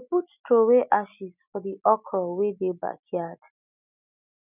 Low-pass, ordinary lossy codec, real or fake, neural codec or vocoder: 3.6 kHz; none; real; none